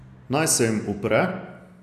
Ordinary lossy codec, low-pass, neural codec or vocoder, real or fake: none; 14.4 kHz; none; real